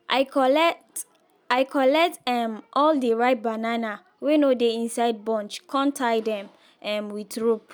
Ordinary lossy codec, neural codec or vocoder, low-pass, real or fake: none; none; none; real